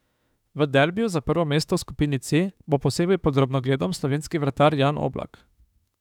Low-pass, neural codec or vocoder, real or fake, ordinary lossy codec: 19.8 kHz; autoencoder, 48 kHz, 32 numbers a frame, DAC-VAE, trained on Japanese speech; fake; none